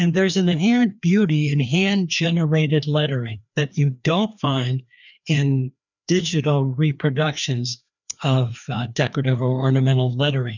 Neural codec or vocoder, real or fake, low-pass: codec, 16 kHz, 2 kbps, FreqCodec, larger model; fake; 7.2 kHz